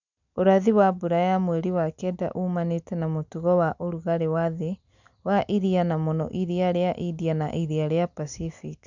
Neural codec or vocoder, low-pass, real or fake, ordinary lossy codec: none; 7.2 kHz; real; none